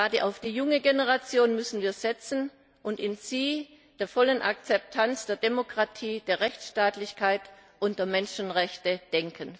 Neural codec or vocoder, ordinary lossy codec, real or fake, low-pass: none; none; real; none